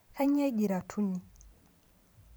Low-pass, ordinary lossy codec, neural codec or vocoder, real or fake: none; none; vocoder, 44.1 kHz, 128 mel bands every 512 samples, BigVGAN v2; fake